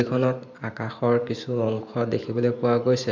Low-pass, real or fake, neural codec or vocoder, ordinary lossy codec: 7.2 kHz; fake; vocoder, 44.1 kHz, 128 mel bands every 512 samples, BigVGAN v2; MP3, 64 kbps